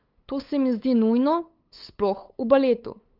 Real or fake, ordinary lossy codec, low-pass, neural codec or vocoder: fake; Opus, 32 kbps; 5.4 kHz; codec, 16 kHz, 8 kbps, FunCodec, trained on LibriTTS, 25 frames a second